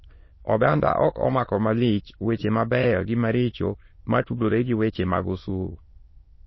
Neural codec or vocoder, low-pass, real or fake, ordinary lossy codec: autoencoder, 22.05 kHz, a latent of 192 numbers a frame, VITS, trained on many speakers; 7.2 kHz; fake; MP3, 24 kbps